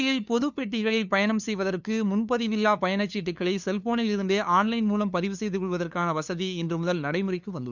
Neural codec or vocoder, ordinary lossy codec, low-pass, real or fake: codec, 16 kHz, 2 kbps, FunCodec, trained on LibriTTS, 25 frames a second; none; 7.2 kHz; fake